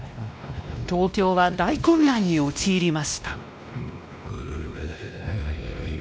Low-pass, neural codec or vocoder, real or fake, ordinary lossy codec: none; codec, 16 kHz, 1 kbps, X-Codec, WavLM features, trained on Multilingual LibriSpeech; fake; none